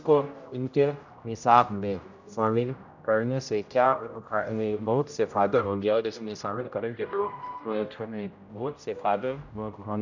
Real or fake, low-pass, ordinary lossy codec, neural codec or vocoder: fake; 7.2 kHz; none; codec, 16 kHz, 0.5 kbps, X-Codec, HuBERT features, trained on general audio